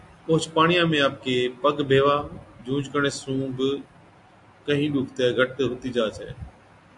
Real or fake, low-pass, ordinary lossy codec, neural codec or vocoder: real; 10.8 kHz; AAC, 64 kbps; none